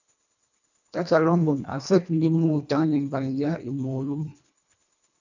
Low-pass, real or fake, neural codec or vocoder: 7.2 kHz; fake; codec, 24 kHz, 1.5 kbps, HILCodec